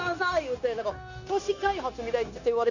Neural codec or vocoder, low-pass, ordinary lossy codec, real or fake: codec, 16 kHz, 0.9 kbps, LongCat-Audio-Codec; 7.2 kHz; none; fake